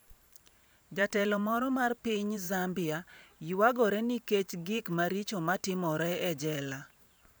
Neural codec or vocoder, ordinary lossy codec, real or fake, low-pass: vocoder, 44.1 kHz, 128 mel bands every 512 samples, BigVGAN v2; none; fake; none